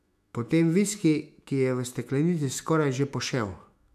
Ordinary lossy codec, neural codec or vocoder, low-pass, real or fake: none; autoencoder, 48 kHz, 128 numbers a frame, DAC-VAE, trained on Japanese speech; 14.4 kHz; fake